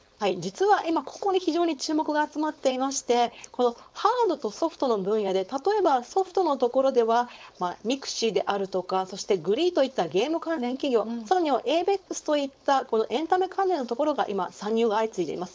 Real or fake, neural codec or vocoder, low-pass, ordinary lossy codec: fake; codec, 16 kHz, 4.8 kbps, FACodec; none; none